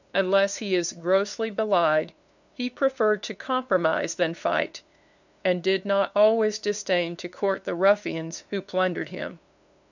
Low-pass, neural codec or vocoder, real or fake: 7.2 kHz; codec, 16 kHz, 2 kbps, FunCodec, trained on LibriTTS, 25 frames a second; fake